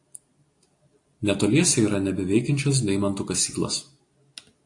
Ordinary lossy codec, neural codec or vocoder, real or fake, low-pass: AAC, 48 kbps; none; real; 10.8 kHz